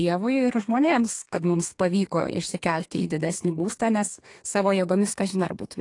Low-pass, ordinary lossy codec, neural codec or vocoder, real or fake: 10.8 kHz; AAC, 48 kbps; codec, 32 kHz, 1.9 kbps, SNAC; fake